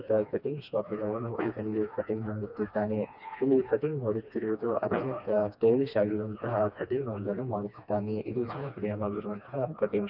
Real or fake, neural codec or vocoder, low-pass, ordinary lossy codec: fake; codec, 16 kHz, 2 kbps, FreqCodec, smaller model; 5.4 kHz; none